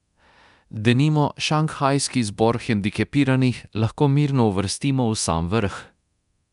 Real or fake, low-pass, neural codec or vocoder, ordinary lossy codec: fake; 10.8 kHz; codec, 24 kHz, 0.9 kbps, DualCodec; none